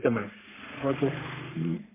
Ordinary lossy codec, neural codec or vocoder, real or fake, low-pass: MP3, 24 kbps; codec, 44.1 kHz, 3.4 kbps, Pupu-Codec; fake; 3.6 kHz